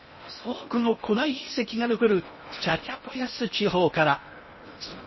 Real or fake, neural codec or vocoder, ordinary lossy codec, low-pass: fake; codec, 16 kHz in and 24 kHz out, 0.6 kbps, FocalCodec, streaming, 4096 codes; MP3, 24 kbps; 7.2 kHz